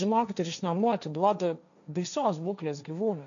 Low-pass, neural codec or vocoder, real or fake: 7.2 kHz; codec, 16 kHz, 1.1 kbps, Voila-Tokenizer; fake